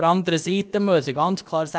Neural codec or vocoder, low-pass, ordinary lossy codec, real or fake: codec, 16 kHz, about 1 kbps, DyCAST, with the encoder's durations; none; none; fake